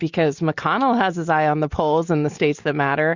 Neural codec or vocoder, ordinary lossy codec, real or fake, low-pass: none; Opus, 64 kbps; real; 7.2 kHz